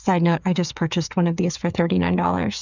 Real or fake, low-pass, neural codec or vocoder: fake; 7.2 kHz; codec, 16 kHz, 8 kbps, FreqCodec, smaller model